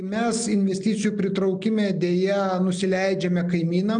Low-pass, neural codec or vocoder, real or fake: 9.9 kHz; none; real